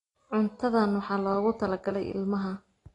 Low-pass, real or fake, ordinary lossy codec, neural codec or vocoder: 10.8 kHz; real; AAC, 32 kbps; none